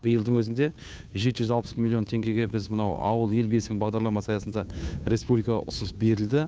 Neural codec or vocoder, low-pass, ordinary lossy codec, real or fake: codec, 16 kHz, 2 kbps, FunCodec, trained on Chinese and English, 25 frames a second; none; none; fake